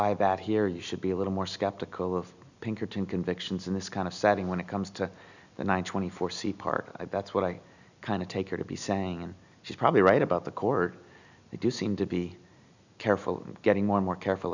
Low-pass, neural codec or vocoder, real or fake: 7.2 kHz; none; real